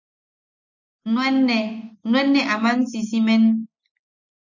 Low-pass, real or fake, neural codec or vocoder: 7.2 kHz; real; none